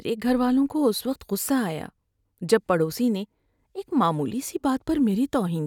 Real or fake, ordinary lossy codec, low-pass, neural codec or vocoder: real; none; 19.8 kHz; none